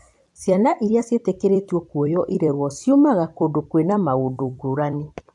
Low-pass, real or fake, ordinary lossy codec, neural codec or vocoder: 10.8 kHz; fake; AAC, 64 kbps; vocoder, 44.1 kHz, 128 mel bands every 256 samples, BigVGAN v2